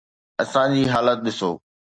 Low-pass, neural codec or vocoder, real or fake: 9.9 kHz; none; real